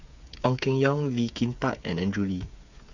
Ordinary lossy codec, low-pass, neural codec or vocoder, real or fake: none; 7.2 kHz; codec, 16 kHz, 8 kbps, FreqCodec, smaller model; fake